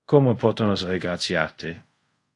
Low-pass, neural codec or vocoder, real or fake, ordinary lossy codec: 10.8 kHz; codec, 24 kHz, 0.5 kbps, DualCodec; fake; AAC, 48 kbps